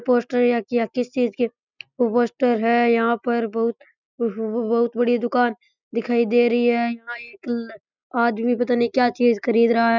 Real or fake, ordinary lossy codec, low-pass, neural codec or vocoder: real; none; 7.2 kHz; none